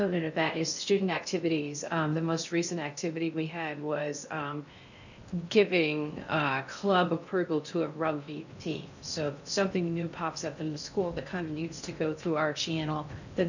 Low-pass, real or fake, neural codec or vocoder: 7.2 kHz; fake; codec, 16 kHz in and 24 kHz out, 0.6 kbps, FocalCodec, streaming, 4096 codes